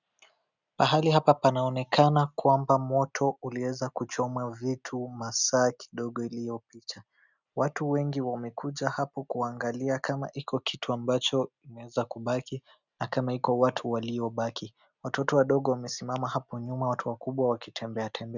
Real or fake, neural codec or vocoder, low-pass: real; none; 7.2 kHz